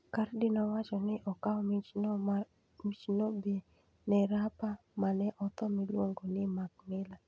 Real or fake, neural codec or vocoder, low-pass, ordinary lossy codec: real; none; none; none